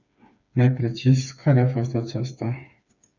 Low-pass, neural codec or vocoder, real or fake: 7.2 kHz; codec, 16 kHz, 4 kbps, FreqCodec, smaller model; fake